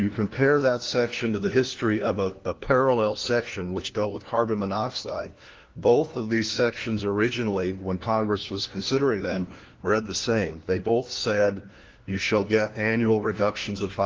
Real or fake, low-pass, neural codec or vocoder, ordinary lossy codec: fake; 7.2 kHz; codec, 16 kHz, 1 kbps, FunCodec, trained on LibriTTS, 50 frames a second; Opus, 16 kbps